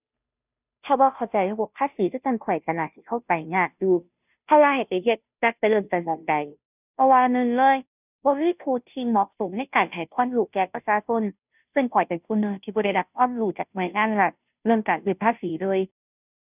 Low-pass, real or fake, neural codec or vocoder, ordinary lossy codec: 3.6 kHz; fake; codec, 16 kHz, 0.5 kbps, FunCodec, trained on Chinese and English, 25 frames a second; none